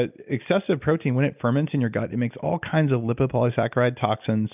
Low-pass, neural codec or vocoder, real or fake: 3.6 kHz; none; real